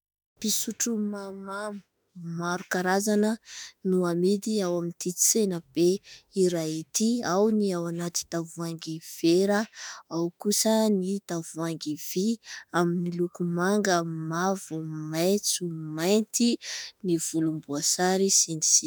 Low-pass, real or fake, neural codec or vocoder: 19.8 kHz; fake; autoencoder, 48 kHz, 32 numbers a frame, DAC-VAE, trained on Japanese speech